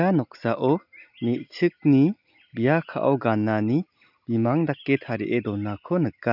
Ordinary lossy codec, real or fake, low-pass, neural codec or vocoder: none; real; 5.4 kHz; none